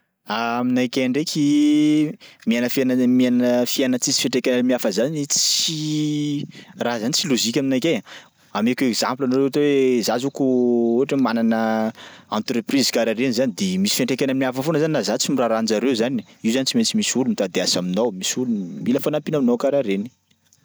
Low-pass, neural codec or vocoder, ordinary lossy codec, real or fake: none; none; none; real